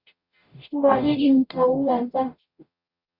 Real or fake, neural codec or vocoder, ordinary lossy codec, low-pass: fake; codec, 44.1 kHz, 0.9 kbps, DAC; Opus, 64 kbps; 5.4 kHz